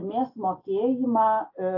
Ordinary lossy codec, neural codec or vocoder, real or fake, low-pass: AAC, 48 kbps; none; real; 5.4 kHz